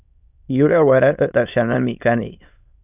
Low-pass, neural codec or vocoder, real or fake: 3.6 kHz; autoencoder, 22.05 kHz, a latent of 192 numbers a frame, VITS, trained on many speakers; fake